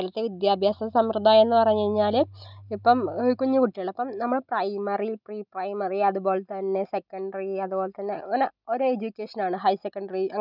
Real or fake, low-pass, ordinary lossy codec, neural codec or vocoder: real; 5.4 kHz; none; none